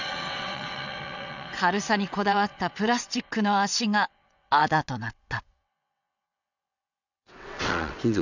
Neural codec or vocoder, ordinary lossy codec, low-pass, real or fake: vocoder, 22.05 kHz, 80 mel bands, WaveNeXt; none; 7.2 kHz; fake